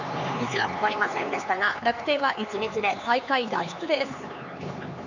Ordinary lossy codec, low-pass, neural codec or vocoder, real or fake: none; 7.2 kHz; codec, 16 kHz, 4 kbps, X-Codec, HuBERT features, trained on LibriSpeech; fake